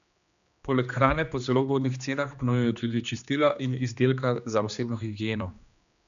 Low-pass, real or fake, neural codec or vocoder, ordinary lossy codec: 7.2 kHz; fake; codec, 16 kHz, 2 kbps, X-Codec, HuBERT features, trained on general audio; AAC, 96 kbps